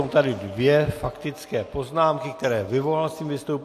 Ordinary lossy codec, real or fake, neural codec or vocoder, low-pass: AAC, 64 kbps; real; none; 14.4 kHz